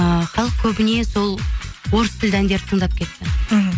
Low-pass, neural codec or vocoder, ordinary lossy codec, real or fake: none; none; none; real